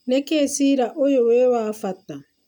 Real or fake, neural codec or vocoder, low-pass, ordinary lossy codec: real; none; none; none